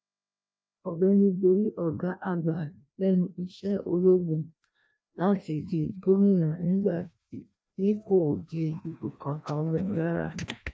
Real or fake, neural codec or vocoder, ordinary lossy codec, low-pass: fake; codec, 16 kHz, 1 kbps, FreqCodec, larger model; none; none